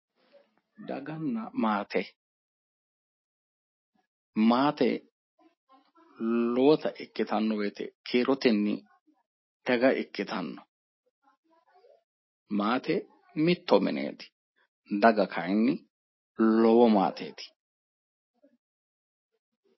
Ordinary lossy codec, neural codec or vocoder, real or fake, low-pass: MP3, 24 kbps; none; real; 7.2 kHz